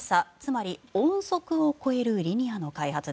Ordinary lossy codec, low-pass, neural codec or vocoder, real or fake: none; none; none; real